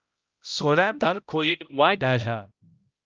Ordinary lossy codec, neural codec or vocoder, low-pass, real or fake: Opus, 24 kbps; codec, 16 kHz, 0.5 kbps, X-Codec, HuBERT features, trained on balanced general audio; 7.2 kHz; fake